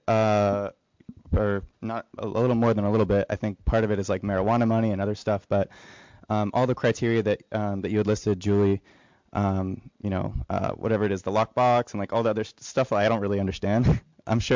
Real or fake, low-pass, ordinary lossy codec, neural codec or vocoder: real; 7.2 kHz; MP3, 64 kbps; none